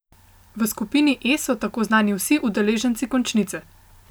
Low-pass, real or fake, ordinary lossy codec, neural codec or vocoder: none; real; none; none